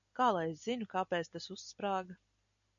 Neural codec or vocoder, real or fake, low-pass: none; real; 7.2 kHz